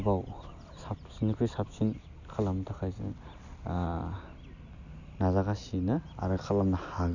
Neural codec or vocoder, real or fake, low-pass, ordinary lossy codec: none; real; 7.2 kHz; none